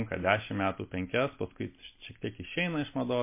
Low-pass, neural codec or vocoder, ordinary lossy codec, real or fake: 3.6 kHz; none; MP3, 24 kbps; real